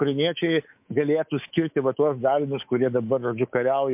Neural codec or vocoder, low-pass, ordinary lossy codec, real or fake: codec, 24 kHz, 3.1 kbps, DualCodec; 3.6 kHz; MP3, 32 kbps; fake